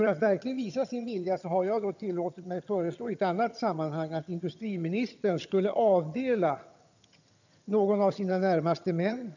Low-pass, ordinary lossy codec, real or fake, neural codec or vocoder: 7.2 kHz; none; fake; vocoder, 22.05 kHz, 80 mel bands, HiFi-GAN